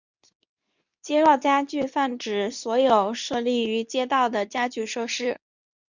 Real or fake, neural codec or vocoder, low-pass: fake; codec, 24 kHz, 0.9 kbps, WavTokenizer, medium speech release version 2; 7.2 kHz